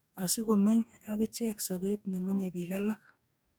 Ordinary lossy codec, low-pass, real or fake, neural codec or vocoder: none; none; fake; codec, 44.1 kHz, 2.6 kbps, DAC